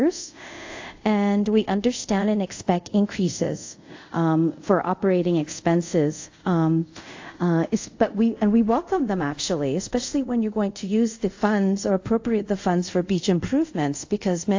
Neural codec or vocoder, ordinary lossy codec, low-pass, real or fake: codec, 24 kHz, 0.5 kbps, DualCodec; AAC, 48 kbps; 7.2 kHz; fake